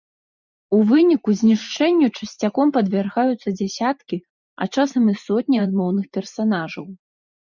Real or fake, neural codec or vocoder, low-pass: fake; vocoder, 44.1 kHz, 128 mel bands every 512 samples, BigVGAN v2; 7.2 kHz